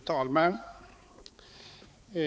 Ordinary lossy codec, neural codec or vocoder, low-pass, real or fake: none; codec, 16 kHz, 4 kbps, X-Codec, HuBERT features, trained on balanced general audio; none; fake